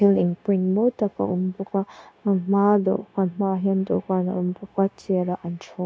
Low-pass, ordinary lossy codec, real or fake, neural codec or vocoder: none; none; fake; codec, 16 kHz, 0.9 kbps, LongCat-Audio-Codec